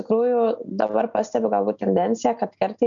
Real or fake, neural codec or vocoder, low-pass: real; none; 7.2 kHz